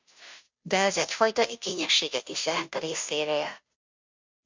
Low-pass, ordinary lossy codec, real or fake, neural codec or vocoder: 7.2 kHz; MP3, 64 kbps; fake; codec, 16 kHz, 0.5 kbps, FunCodec, trained on Chinese and English, 25 frames a second